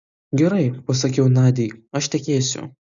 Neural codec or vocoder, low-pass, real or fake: none; 7.2 kHz; real